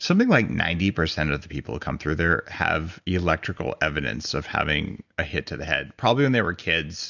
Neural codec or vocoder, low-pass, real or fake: none; 7.2 kHz; real